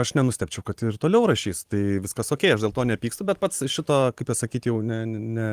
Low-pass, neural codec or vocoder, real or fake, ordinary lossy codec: 14.4 kHz; vocoder, 44.1 kHz, 128 mel bands every 512 samples, BigVGAN v2; fake; Opus, 24 kbps